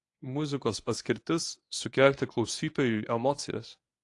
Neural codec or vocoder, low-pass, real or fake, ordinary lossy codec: codec, 24 kHz, 0.9 kbps, WavTokenizer, medium speech release version 1; 10.8 kHz; fake; AAC, 48 kbps